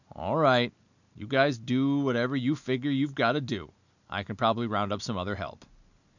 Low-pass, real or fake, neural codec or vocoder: 7.2 kHz; real; none